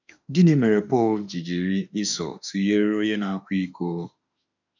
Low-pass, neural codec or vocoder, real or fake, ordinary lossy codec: 7.2 kHz; autoencoder, 48 kHz, 32 numbers a frame, DAC-VAE, trained on Japanese speech; fake; none